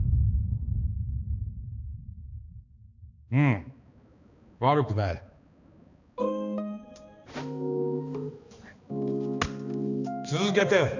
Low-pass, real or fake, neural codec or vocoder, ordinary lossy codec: 7.2 kHz; fake; codec, 16 kHz, 2 kbps, X-Codec, HuBERT features, trained on balanced general audio; none